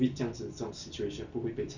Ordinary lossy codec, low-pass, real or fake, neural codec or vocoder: none; 7.2 kHz; real; none